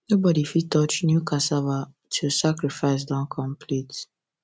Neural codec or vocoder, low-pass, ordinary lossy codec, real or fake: none; none; none; real